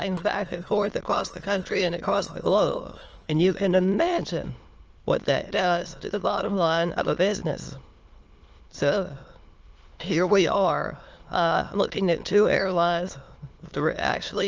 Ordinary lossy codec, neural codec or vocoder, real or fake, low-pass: Opus, 24 kbps; autoencoder, 22.05 kHz, a latent of 192 numbers a frame, VITS, trained on many speakers; fake; 7.2 kHz